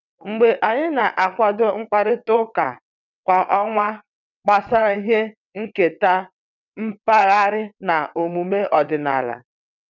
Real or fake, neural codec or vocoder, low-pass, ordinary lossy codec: fake; vocoder, 22.05 kHz, 80 mel bands, WaveNeXt; 7.2 kHz; none